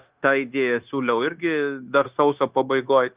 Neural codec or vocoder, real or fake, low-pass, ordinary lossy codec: codec, 16 kHz, 0.9 kbps, LongCat-Audio-Codec; fake; 3.6 kHz; Opus, 32 kbps